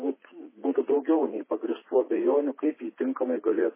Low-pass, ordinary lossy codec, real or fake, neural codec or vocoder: 3.6 kHz; MP3, 16 kbps; fake; vocoder, 44.1 kHz, 80 mel bands, Vocos